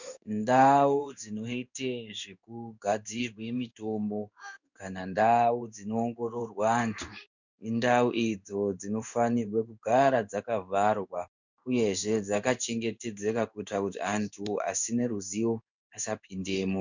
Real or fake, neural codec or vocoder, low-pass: fake; codec, 16 kHz in and 24 kHz out, 1 kbps, XY-Tokenizer; 7.2 kHz